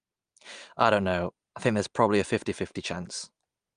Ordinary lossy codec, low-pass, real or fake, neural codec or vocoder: Opus, 32 kbps; 9.9 kHz; real; none